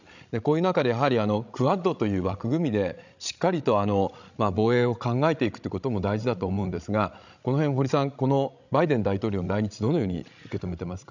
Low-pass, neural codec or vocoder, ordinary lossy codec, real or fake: 7.2 kHz; codec, 16 kHz, 16 kbps, FreqCodec, larger model; none; fake